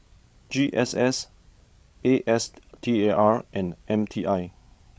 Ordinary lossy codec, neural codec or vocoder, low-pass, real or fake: none; codec, 16 kHz, 16 kbps, FreqCodec, larger model; none; fake